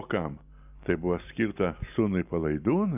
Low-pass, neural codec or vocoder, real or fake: 3.6 kHz; none; real